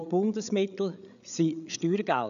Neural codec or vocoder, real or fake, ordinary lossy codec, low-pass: codec, 16 kHz, 8 kbps, FreqCodec, larger model; fake; none; 7.2 kHz